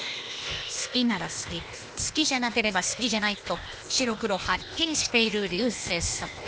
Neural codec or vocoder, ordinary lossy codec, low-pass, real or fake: codec, 16 kHz, 0.8 kbps, ZipCodec; none; none; fake